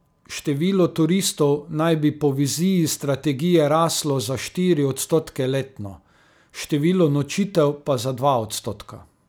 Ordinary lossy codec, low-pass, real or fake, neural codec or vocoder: none; none; real; none